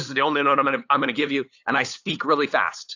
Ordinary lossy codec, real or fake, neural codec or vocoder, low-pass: AAC, 48 kbps; fake; codec, 16 kHz, 8 kbps, FunCodec, trained on LibriTTS, 25 frames a second; 7.2 kHz